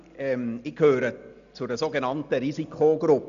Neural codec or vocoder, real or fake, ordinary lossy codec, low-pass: none; real; none; 7.2 kHz